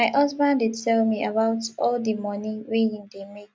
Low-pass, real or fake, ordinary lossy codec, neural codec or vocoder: none; real; none; none